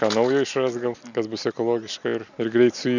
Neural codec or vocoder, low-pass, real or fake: none; 7.2 kHz; real